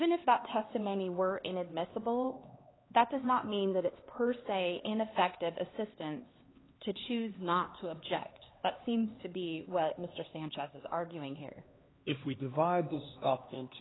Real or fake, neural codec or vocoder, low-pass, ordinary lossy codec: fake; codec, 16 kHz, 2 kbps, X-Codec, HuBERT features, trained on LibriSpeech; 7.2 kHz; AAC, 16 kbps